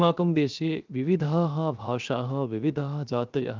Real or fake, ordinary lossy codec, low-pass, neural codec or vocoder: fake; Opus, 24 kbps; 7.2 kHz; codec, 16 kHz, 0.7 kbps, FocalCodec